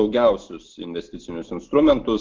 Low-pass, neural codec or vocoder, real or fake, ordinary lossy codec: 7.2 kHz; none; real; Opus, 16 kbps